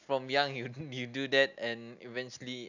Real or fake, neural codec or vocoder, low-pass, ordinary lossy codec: real; none; 7.2 kHz; none